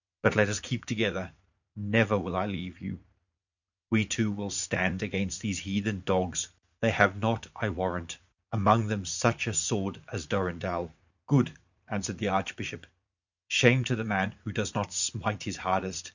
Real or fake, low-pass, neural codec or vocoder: fake; 7.2 kHz; vocoder, 44.1 kHz, 80 mel bands, Vocos